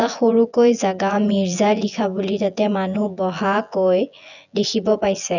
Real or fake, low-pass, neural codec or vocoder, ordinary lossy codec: fake; 7.2 kHz; vocoder, 24 kHz, 100 mel bands, Vocos; none